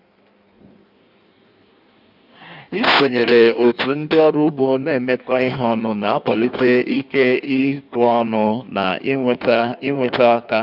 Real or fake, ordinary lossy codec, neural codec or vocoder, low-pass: fake; none; codec, 16 kHz in and 24 kHz out, 1.1 kbps, FireRedTTS-2 codec; 5.4 kHz